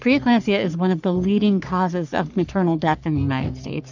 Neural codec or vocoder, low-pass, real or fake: codec, 44.1 kHz, 3.4 kbps, Pupu-Codec; 7.2 kHz; fake